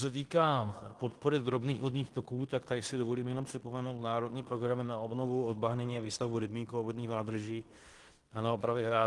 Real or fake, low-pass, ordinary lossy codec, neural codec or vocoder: fake; 10.8 kHz; Opus, 24 kbps; codec, 16 kHz in and 24 kHz out, 0.9 kbps, LongCat-Audio-Codec, fine tuned four codebook decoder